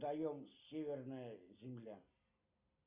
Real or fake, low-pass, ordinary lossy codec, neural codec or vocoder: real; 3.6 kHz; Opus, 64 kbps; none